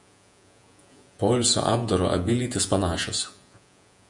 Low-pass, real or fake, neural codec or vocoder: 10.8 kHz; fake; vocoder, 48 kHz, 128 mel bands, Vocos